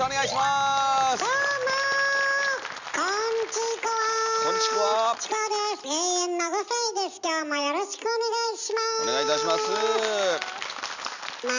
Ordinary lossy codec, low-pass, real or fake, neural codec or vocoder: none; 7.2 kHz; real; none